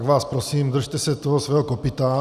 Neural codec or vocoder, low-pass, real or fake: none; 14.4 kHz; real